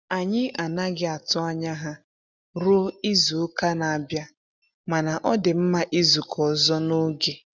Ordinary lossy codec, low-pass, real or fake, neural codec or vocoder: none; none; real; none